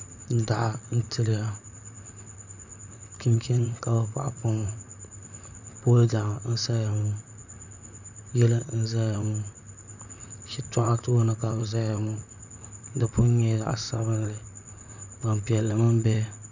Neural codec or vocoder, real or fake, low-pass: vocoder, 44.1 kHz, 128 mel bands, Pupu-Vocoder; fake; 7.2 kHz